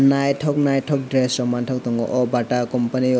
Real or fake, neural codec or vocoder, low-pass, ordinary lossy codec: real; none; none; none